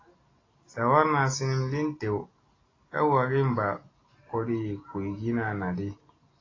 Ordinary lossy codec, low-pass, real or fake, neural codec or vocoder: AAC, 32 kbps; 7.2 kHz; real; none